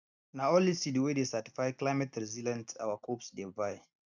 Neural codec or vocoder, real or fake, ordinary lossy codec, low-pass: none; real; none; 7.2 kHz